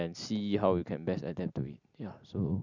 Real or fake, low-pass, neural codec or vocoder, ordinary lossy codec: real; 7.2 kHz; none; none